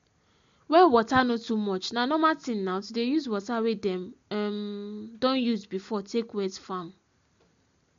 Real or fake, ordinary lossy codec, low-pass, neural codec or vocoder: real; MP3, 48 kbps; 7.2 kHz; none